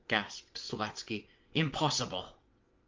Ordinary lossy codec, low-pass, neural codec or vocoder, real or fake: Opus, 32 kbps; 7.2 kHz; vocoder, 22.05 kHz, 80 mel bands, WaveNeXt; fake